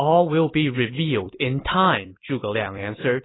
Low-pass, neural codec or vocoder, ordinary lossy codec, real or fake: 7.2 kHz; vocoder, 44.1 kHz, 128 mel bands every 256 samples, BigVGAN v2; AAC, 16 kbps; fake